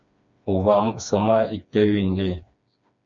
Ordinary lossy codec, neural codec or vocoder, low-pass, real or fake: MP3, 48 kbps; codec, 16 kHz, 2 kbps, FreqCodec, smaller model; 7.2 kHz; fake